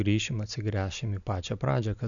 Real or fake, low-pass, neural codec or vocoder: real; 7.2 kHz; none